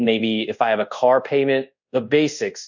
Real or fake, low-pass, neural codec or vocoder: fake; 7.2 kHz; codec, 24 kHz, 0.5 kbps, DualCodec